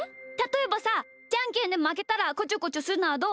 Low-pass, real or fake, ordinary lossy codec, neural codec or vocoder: none; real; none; none